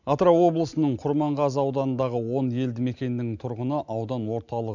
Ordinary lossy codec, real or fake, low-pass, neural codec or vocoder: none; real; 7.2 kHz; none